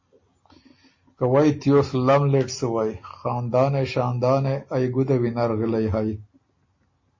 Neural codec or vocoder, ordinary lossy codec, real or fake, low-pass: none; MP3, 32 kbps; real; 7.2 kHz